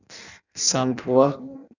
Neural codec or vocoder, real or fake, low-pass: codec, 16 kHz in and 24 kHz out, 0.6 kbps, FireRedTTS-2 codec; fake; 7.2 kHz